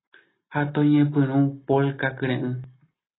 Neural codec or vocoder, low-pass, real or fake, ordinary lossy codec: none; 7.2 kHz; real; AAC, 16 kbps